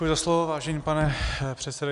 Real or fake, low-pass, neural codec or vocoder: real; 10.8 kHz; none